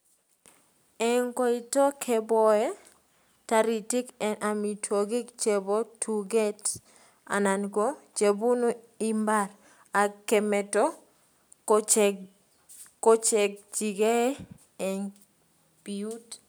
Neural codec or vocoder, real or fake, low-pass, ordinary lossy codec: none; real; none; none